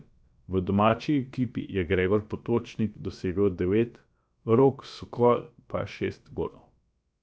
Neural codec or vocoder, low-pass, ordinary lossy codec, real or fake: codec, 16 kHz, about 1 kbps, DyCAST, with the encoder's durations; none; none; fake